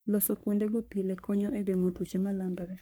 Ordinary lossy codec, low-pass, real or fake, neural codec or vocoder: none; none; fake; codec, 44.1 kHz, 3.4 kbps, Pupu-Codec